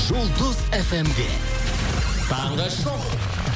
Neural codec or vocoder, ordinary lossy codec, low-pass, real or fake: none; none; none; real